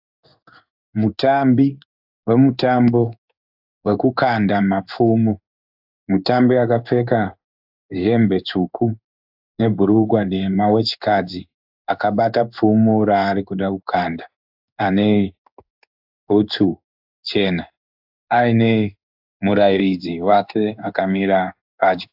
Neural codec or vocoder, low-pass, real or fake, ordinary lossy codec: codec, 16 kHz in and 24 kHz out, 1 kbps, XY-Tokenizer; 5.4 kHz; fake; AAC, 48 kbps